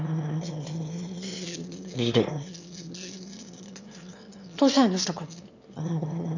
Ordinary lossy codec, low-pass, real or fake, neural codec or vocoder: none; 7.2 kHz; fake; autoencoder, 22.05 kHz, a latent of 192 numbers a frame, VITS, trained on one speaker